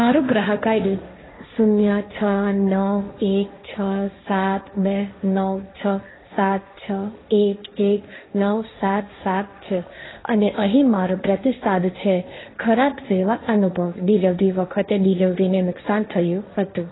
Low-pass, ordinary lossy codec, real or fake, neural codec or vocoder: 7.2 kHz; AAC, 16 kbps; fake; codec, 16 kHz, 1.1 kbps, Voila-Tokenizer